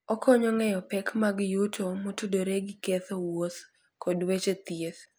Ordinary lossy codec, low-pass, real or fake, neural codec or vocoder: none; none; real; none